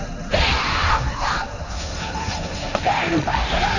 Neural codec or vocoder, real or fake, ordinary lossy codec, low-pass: codec, 16 kHz, 1.1 kbps, Voila-Tokenizer; fake; none; 7.2 kHz